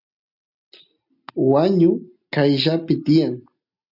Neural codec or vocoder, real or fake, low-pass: none; real; 5.4 kHz